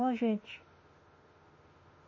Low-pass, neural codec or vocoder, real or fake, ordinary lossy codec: 7.2 kHz; autoencoder, 48 kHz, 32 numbers a frame, DAC-VAE, trained on Japanese speech; fake; MP3, 32 kbps